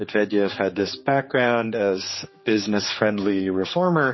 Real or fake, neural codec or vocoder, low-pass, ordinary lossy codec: fake; codec, 16 kHz, 4 kbps, X-Codec, HuBERT features, trained on general audio; 7.2 kHz; MP3, 24 kbps